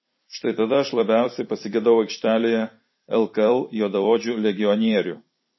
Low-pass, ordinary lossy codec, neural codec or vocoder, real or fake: 7.2 kHz; MP3, 24 kbps; vocoder, 44.1 kHz, 128 mel bands every 256 samples, BigVGAN v2; fake